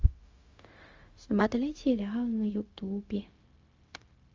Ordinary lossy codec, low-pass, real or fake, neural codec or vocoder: Opus, 32 kbps; 7.2 kHz; fake; codec, 16 kHz, 0.4 kbps, LongCat-Audio-Codec